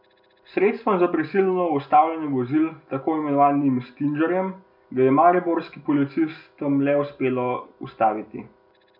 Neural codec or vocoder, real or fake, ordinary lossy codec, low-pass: none; real; none; 5.4 kHz